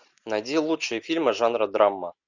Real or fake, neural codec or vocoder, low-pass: real; none; 7.2 kHz